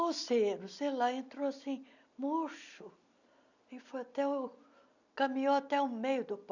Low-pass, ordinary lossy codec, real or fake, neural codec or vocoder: 7.2 kHz; none; real; none